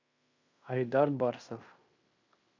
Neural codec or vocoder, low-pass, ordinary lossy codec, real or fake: codec, 16 kHz in and 24 kHz out, 0.9 kbps, LongCat-Audio-Codec, fine tuned four codebook decoder; 7.2 kHz; MP3, 64 kbps; fake